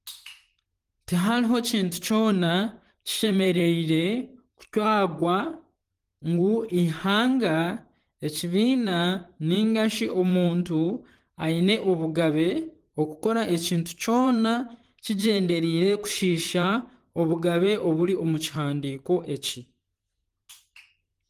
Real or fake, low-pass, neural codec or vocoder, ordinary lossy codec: fake; 14.4 kHz; vocoder, 44.1 kHz, 128 mel bands, Pupu-Vocoder; Opus, 24 kbps